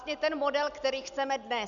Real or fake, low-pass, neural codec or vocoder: real; 7.2 kHz; none